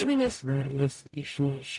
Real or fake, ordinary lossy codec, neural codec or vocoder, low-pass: fake; AAC, 64 kbps; codec, 44.1 kHz, 0.9 kbps, DAC; 10.8 kHz